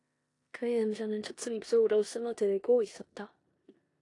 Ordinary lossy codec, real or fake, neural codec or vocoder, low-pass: AAC, 48 kbps; fake; codec, 16 kHz in and 24 kHz out, 0.9 kbps, LongCat-Audio-Codec, four codebook decoder; 10.8 kHz